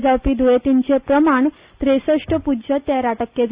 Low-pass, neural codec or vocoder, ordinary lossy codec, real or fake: 3.6 kHz; none; Opus, 64 kbps; real